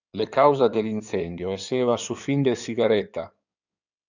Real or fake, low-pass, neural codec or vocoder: fake; 7.2 kHz; codec, 16 kHz in and 24 kHz out, 2.2 kbps, FireRedTTS-2 codec